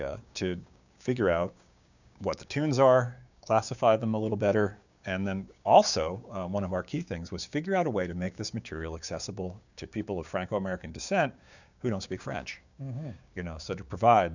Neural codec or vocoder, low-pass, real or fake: codec, 16 kHz, 6 kbps, DAC; 7.2 kHz; fake